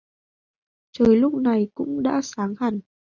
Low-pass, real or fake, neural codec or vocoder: 7.2 kHz; real; none